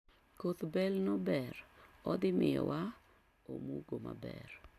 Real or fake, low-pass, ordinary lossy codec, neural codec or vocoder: real; 14.4 kHz; AAC, 96 kbps; none